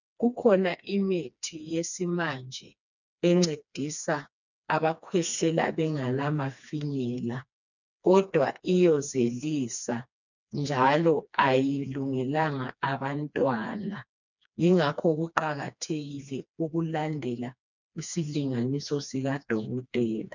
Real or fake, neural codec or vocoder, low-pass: fake; codec, 16 kHz, 2 kbps, FreqCodec, smaller model; 7.2 kHz